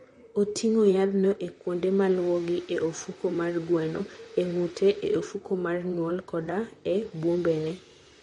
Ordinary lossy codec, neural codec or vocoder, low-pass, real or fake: MP3, 48 kbps; vocoder, 44.1 kHz, 128 mel bands, Pupu-Vocoder; 19.8 kHz; fake